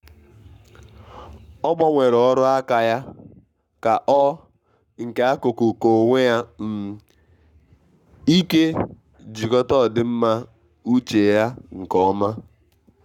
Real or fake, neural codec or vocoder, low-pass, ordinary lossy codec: fake; codec, 44.1 kHz, 7.8 kbps, Pupu-Codec; 19.8 kHz; none